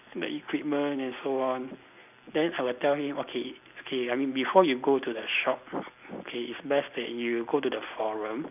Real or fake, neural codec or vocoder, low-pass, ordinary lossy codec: real; none; 3.6 kHz; none